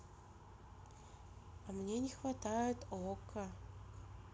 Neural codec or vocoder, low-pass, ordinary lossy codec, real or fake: none; none; none; real